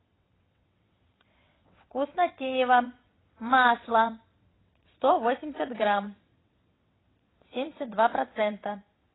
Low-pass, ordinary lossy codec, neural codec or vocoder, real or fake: 7.2 kHz; AAC, 16 kbps; vocoder, 22.05 kHz, 80 mel bands, WaveNeXt; fake